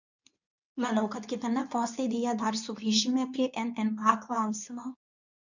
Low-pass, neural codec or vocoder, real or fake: 7.2 kHz; codec, 24 kHz, 0.9 kbps, WavTokenizer, medium speech release version 2; fake